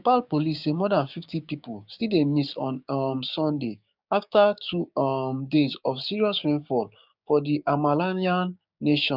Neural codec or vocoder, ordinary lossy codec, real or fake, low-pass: codec, 16 kHz, 6 kbps, DAC; none; fake; 5.4 kHz